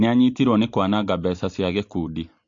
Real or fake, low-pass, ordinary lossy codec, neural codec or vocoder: real; 7.2 kHz; MP3, 48 kbps; none